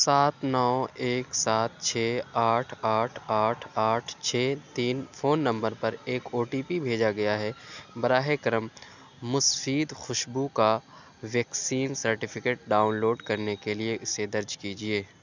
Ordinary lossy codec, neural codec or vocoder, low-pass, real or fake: none; none; 7.2 kHz; real